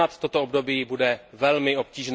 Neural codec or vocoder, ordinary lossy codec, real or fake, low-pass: none; none; real; none